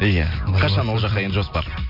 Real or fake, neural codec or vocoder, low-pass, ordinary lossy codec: real; none; 5.4 kHz; none